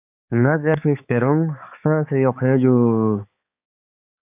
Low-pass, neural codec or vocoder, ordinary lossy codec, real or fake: 3.6 kHz; codec, 16 kHz, 4 kbps, X-Codec, HuBERT features, trained on balanced general audio; AAC, 32 kbps; fake